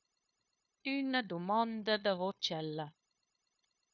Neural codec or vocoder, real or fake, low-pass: codec, 16 kHz, 0.9 kbps, LongCat-Audio-Codec; fake; 7.2 kHz